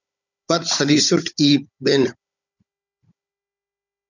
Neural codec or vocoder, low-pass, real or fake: codec, 16 kHz, 16 kbps, FunCodec, trained on Chinese and English, 50 frames a second; 7.2 kHz; fake